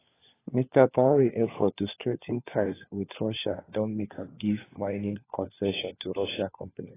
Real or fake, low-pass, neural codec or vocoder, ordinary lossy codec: fake; 3.6 kHz; codec, 16 kHz, 4 kbps, FunCodec, trained on LibriTTS, 50 frames a second; AAC, 16 kbps